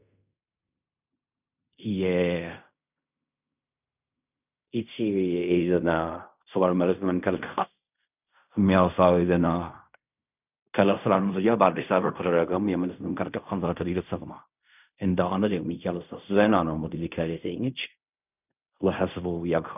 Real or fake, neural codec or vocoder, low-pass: fake; codec, 16 kHz in and 24 kHz out, 0.4 kbps, LongCat-Audio-Codec, fine tuned four codebook decoder; 3.6 kHz